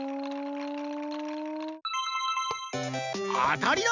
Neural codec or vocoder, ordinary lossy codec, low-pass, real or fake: none; none; 7.2 kHz; real